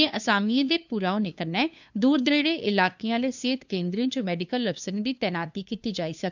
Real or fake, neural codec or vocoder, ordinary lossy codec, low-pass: fake; codec, 16 kHz, 2 kbps, FunCodec, trained on LibriTTS, 25 frames a second; none; 7.2 kHz